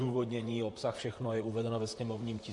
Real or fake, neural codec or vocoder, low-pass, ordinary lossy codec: fake; vocoder, 44.1 kHz, 128 mel bands, Pupu-Vocoder; 10.8 kHz; MP3, 48 kbps